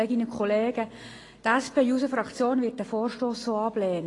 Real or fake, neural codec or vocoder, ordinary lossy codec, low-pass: real; none; AAC, 32 kbps; 10.8 kHz